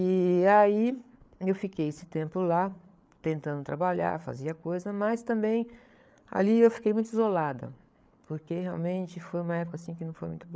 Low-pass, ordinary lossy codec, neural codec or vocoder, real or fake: none; none; codec, 16 kHz, 8 kbps, FreqCodec, larger model; fake